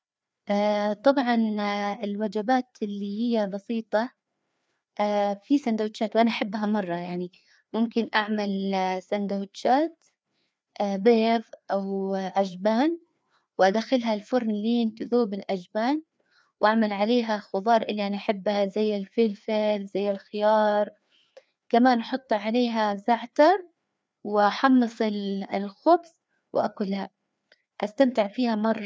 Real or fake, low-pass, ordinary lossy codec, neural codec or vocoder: fake; none; none; codec, 16 kHz, 2 kbps, FreqCodec, larger model